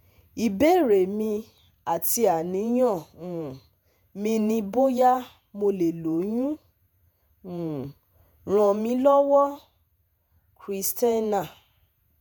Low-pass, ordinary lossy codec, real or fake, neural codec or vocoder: none; none; fake; vocoder, 48 kHz, 128 mel bands, Vocos